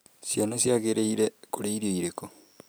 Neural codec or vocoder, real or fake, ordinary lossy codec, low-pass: none; real; none; none